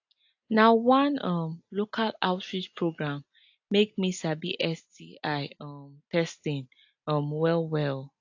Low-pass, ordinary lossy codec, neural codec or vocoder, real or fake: 7.2 kHz; AAC, 48 kbps; none; real